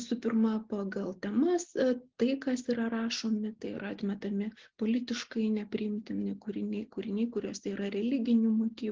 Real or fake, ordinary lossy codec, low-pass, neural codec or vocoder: real; Opus, 16 kbps; 7.2 kHz; none